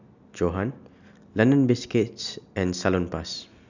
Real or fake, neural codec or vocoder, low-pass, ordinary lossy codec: real; none; 7.2 kHz; none